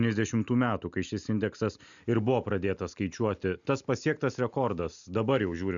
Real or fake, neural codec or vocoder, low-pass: real; none; 7.2 kHz